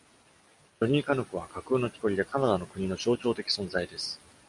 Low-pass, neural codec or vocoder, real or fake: 10.8 kHz; none; real